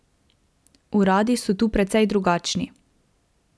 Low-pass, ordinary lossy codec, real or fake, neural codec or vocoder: none; none; real; none